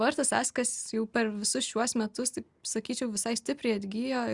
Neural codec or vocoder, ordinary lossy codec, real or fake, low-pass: none; Opus, 64 kbps; real; 10.8 kHz